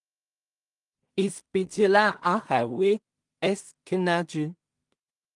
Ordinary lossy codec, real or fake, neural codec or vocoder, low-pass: Opus, 32 kbps; fake; codec, 16 kHz in and 24 kHz out, 0.4 kbps, LongCat-Audio-Codec, two codebook decoder; 10.8 kHz